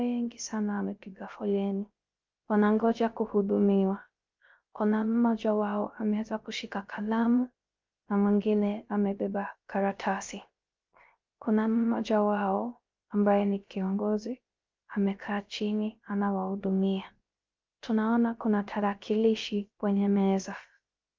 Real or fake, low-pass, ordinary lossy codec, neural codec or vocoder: fake; 7.2 kHz; Opus, 24 kbps; codec, 16 kHz, 0.3 kbps, FocalCodec